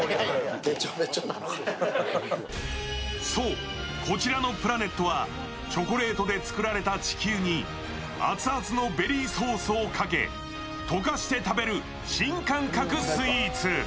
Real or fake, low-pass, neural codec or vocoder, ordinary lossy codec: real; none; none; none